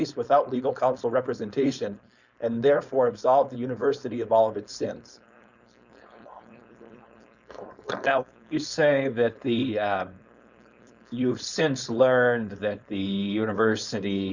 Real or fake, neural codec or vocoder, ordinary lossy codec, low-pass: fake; codec, 16 kHz, 4.8 kbps, FACodec; Opus, 64 kbps; 7.2 kHz